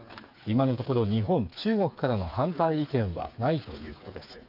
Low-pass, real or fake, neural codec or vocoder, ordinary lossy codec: 5.4 kHz; fake; codec, 16 kHz, 4 kbps, FreqCodec, smaller model; AAC, 48 kbps